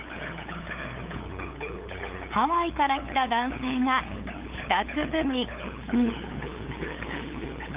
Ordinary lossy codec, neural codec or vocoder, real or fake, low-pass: Opus, 32 kbps; codec, 16 kHz, 8 kbps, FunCodec, trained on LibriTTS, 25 frames a second; fake; 3.6 kHz